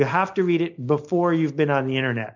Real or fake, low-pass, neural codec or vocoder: real; 7.2 kHz; none